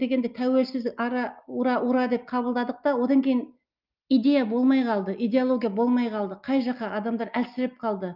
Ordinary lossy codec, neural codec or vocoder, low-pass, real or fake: Opus, 24 kbps; none; 5.4 kHz; real